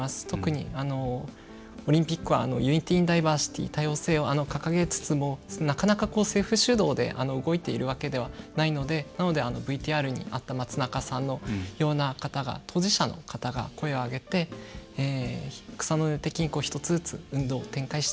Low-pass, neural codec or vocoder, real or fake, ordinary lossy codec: none; none; real; none